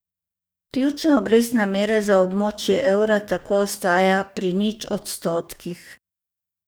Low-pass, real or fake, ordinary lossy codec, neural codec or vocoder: none; fake; none; codec, 44.1 kHz, 2.6 kbps, DAC